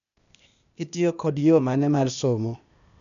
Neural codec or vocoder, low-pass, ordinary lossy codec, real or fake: codec, 16 kHz, 0.8 kbps, ZipCodec; 7.2 kHz; none; fake